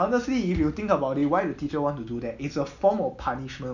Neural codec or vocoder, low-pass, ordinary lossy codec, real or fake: none; 7.2 kHz; none; real